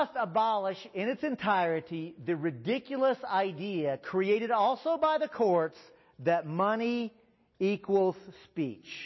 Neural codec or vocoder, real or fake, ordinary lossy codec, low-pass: none; real; MP3, 24 kbps; 7.2 kHz